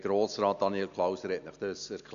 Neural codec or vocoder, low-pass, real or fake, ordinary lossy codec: none; 7.2 kHz; real; none